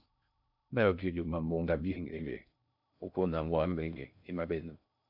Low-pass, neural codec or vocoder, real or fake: 5.4 kHz; codec, 16 kHz in and 24 kHz out, 0.6 kbps, FocalCodec, streaming, 2048 codes; fake